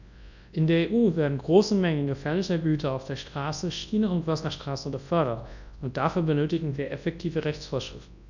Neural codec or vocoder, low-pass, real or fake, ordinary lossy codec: codec, 24 kHz, 0.9 kbps, WavTokenizer, large speech release; 7.2 kHz; fake; none